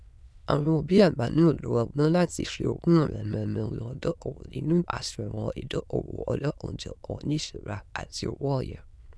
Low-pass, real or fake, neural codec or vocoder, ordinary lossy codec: none; fake; autoencoder, 22.05 kHz, a latent of 192 numbers a frame, VITS, trained on many speakers; none